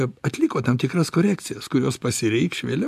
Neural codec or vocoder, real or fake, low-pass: none; real; 14.4 kHz